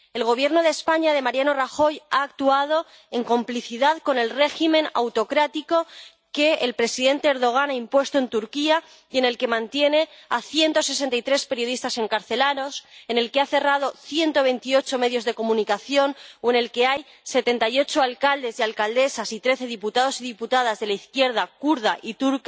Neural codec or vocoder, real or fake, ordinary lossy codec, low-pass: none; real; none; none